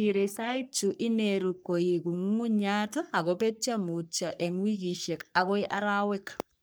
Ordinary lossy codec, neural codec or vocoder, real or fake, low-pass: none; codec, 44.1 kHz, 3.4 kbps, Pupu-Codec; fake; none